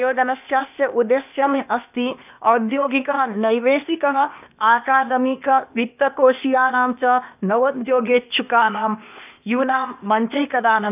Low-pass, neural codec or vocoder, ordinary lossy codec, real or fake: 3.6 kHz; codec, 16 kHz, 0.8 kbps, ZipCodec; none; fake